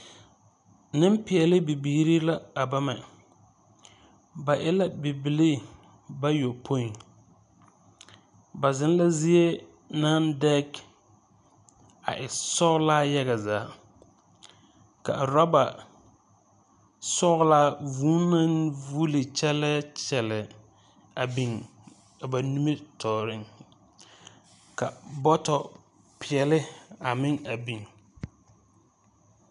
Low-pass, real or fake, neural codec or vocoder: 10.8 kHz; real; none